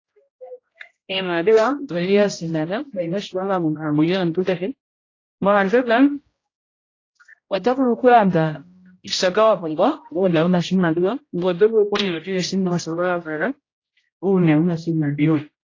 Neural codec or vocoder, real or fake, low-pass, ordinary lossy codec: codec, 16 kHz, 0.5 kbps, X-Codec, HuBERT features, trained on general audio; fake; 7.2 kHz; AAC, 32 kbps